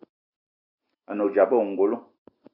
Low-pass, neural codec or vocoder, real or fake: 5.4 kHz; none; real